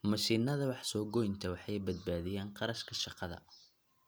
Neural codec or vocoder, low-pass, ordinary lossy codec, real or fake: none; none; none; real